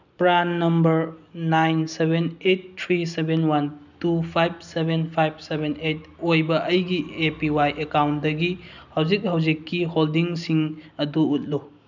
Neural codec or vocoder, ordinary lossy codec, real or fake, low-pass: none; none; real; 7.2 kHz